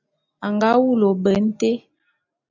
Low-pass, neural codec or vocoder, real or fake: 7.2 kHz; none; real